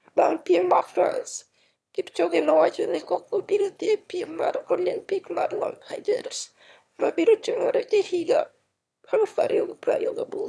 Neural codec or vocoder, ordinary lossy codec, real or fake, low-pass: autoencoder, 22.05 kHz, a latent of 192 numbers a frame, VITS, trained on one speaker; none; fake; none